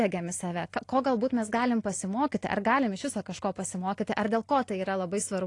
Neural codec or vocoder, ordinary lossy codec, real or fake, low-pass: none; AAC, 48 kbps; real; 10.8 kHz